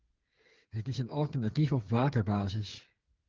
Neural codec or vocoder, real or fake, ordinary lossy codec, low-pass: codec, 16 kHz, 8 kbps, FreqCodec, smaller model; fake; Opus, 16 kbps; 7.2 kHz